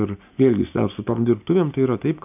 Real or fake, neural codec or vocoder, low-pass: real; none; 3.6 kHz